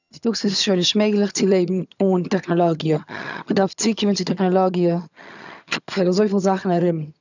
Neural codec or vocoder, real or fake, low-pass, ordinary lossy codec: vocoder, 22.05 kHz, 80 mel bands, HiFi-GAN; fake; 7.2 kHz; none